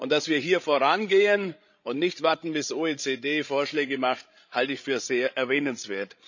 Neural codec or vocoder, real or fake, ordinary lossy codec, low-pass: codec, 16 kHz, 16 kbps, FreqCodec, larger model; fake; none; 7.2 kHz